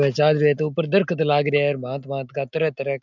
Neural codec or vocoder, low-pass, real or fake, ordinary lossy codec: none; 7.2 kHz; real; none